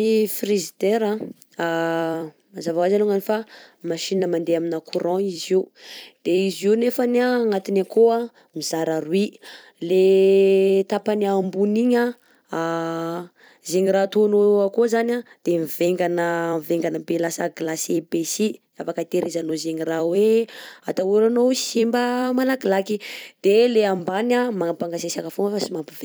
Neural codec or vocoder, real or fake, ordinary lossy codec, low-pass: vocoder, 44.1 kHz, 128 mel bands every 256 samples, BigVGAN v2; fake; none; none